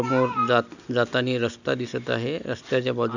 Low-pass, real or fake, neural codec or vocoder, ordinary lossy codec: 7.2 kHz; real; none; none